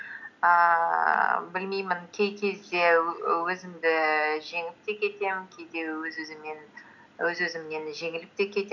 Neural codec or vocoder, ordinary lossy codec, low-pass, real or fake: none; none; 7.2 kHz; real